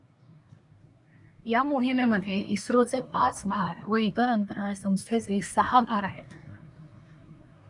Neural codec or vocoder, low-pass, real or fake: codec, 24 kHz, 1 kbps, SNAC; 10.8 kHz; fake